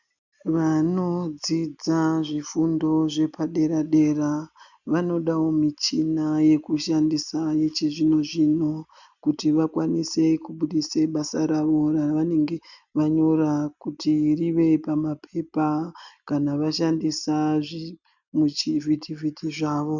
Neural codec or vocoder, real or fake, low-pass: none; real; 7.2 kHz